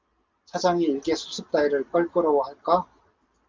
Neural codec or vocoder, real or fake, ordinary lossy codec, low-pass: none; real; Opus, 24 kbps; 7.2 kHz